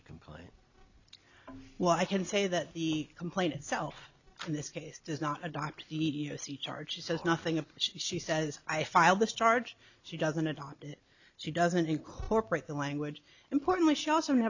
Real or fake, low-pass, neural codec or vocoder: real; 7.2 kHz; none